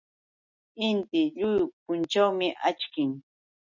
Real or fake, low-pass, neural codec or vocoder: real; 7.2 kHz; none